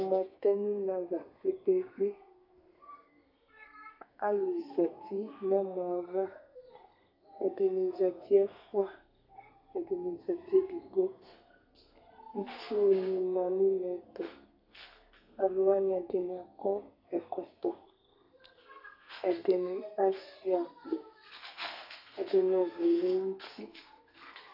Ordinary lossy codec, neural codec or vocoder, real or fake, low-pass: AAC, 48 kbps; codec, 32 kHz, 1.9 kbps, SNAC; fake; 5.4 kHz